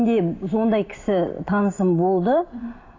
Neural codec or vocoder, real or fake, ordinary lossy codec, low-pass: none; real; AAC, 32 kbps; 7.2 kHz